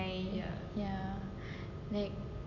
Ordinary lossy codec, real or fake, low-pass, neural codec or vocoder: none; real; 7.2 kHz; none